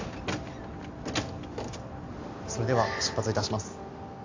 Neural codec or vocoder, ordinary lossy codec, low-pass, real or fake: none; AAC, 48 kbps; 7.2 kHz; real